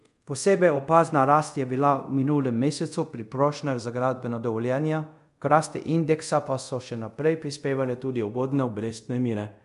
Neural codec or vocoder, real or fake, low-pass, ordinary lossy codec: codec, 24 kHz, 0.5 kbps, DualCodec; fake; 10.8 kHz; MP3, 64 kbps